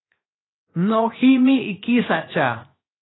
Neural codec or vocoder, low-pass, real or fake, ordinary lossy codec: codec, 16 kHz, 0.7 kbps, FocalCodec; 7.2 kHz; fake; AAC, 16 kbps